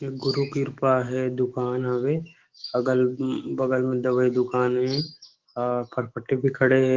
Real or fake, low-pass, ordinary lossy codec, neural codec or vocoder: fake; 7.2 kHz; Opus, 16 kbps; autoencoder, 48 kHz, 128 numbers a frame, DAC-VAE, trained on Japanese speech